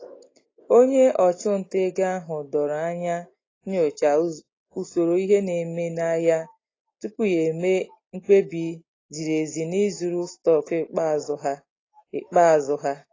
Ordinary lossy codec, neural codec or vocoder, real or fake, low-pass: AAC, 32 kbps; none; real; 7.2 kHz